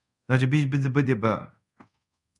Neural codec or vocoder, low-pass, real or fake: codec, 24 kHz, 0.5 kbps, DualCodec; 10.8 kHz; fake